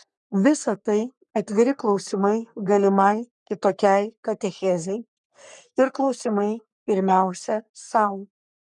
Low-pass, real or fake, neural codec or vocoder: 10.8 kHz; fake; codec, 44.1 kHz, 3.4 kbps, Pupu-Codec